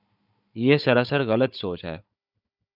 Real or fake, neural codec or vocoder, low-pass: fake; codec, 16 kHz, 16 kbps, FunCodec, trained on Chinese and English, 50 frames a second; 5.4 kHz